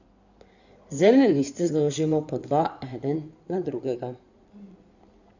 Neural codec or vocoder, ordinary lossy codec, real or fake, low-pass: codec, 16 kHz in and 24 kHz out, 2.2 kbps, FireRedTTS-2 codec; none; fake; 7.2 kHz